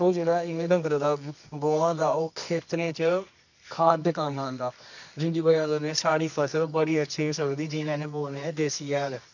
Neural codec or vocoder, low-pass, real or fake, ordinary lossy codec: codec, 24 kHz, 0.9 kbps, WavTokenizer, medium music audio release; 7.2 kHz; fake; none